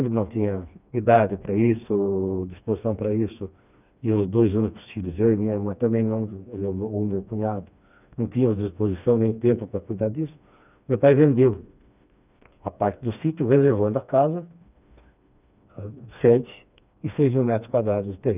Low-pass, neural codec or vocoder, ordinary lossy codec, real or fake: 3.6 kHz; codec, 16 kHz, 2 kbps, FreqCodec, smaller model; none; fake